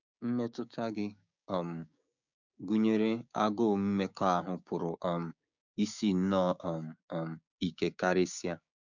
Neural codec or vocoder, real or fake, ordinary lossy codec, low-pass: codec, 16 kHz, 6 kbps, DAC; fake; none; 7.2 kHz